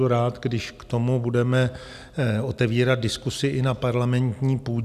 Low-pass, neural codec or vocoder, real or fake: 14.4 kHz; none; real